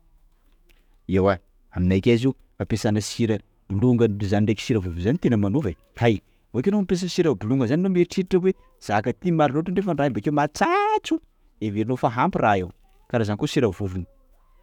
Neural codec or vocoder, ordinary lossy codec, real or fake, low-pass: autoencoder, 48 kHz, 128 numbers a frame, DAC-VAE, trained on Japanese speech; none; fake; 19.8 kHz